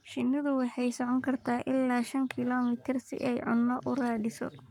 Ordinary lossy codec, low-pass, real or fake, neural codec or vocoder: none; 19.8 kHz; fake; codec, 44.1 kHz, 7.8 kbps, Pupu-Codec